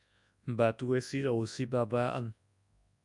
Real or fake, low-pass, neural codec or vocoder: fake; 10.8 kHz; codec, 24 kHz, 0.9 kbps, WavTokenizer, large speech release